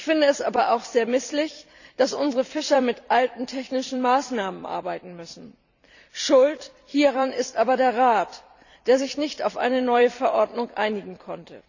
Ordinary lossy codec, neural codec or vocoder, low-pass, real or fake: none; vocoder, 44.1 kHz, 128 mel bands every 256 samples, BigVGAN v2; 7.2 kHz; fake